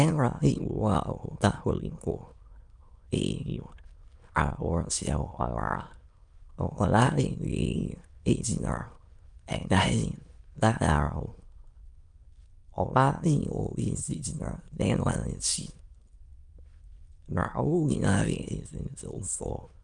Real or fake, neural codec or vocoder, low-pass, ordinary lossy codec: fake; autoencoder, 22.05 kHz, a latent of 192 numbers a frame, VITS, trained on many speakers; 9.9 kHz; Opus, 32 kbps